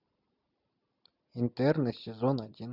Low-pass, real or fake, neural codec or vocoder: 5.4 kHz; real; none